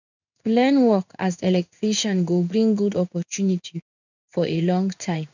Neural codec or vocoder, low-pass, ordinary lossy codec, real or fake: codec, 16 kHz in and 24 kHz out, 1 kbps, XY-Tokenizer; 7.2 kHz; none; fake